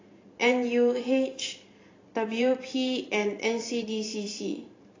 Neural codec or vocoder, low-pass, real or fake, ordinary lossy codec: vocoder, 44.1 kHz, 128 mel bands every 512 samples, BigVGAN v2; 7.2 kHz; fake; AAC, 32 kbps